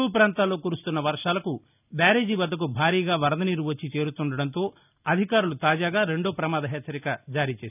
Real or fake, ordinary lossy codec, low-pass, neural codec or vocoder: real; none; 3.6 kHz; none